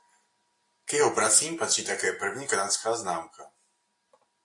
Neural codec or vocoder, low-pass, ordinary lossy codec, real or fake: none; 10.8 kHz; AAC, 48 kbps; real